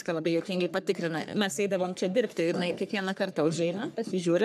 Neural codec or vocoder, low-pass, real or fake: codec, 44.1 kHz, 3.4 kbps, Pupu-Codec; 14.4 kHz; fake